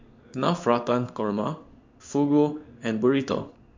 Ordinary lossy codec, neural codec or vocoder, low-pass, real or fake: MP3, 64 kbps; codec, 16 kHz in and 24 kHz out, 1 kbps, XY-Tokenizer; 7.2 kHz; fake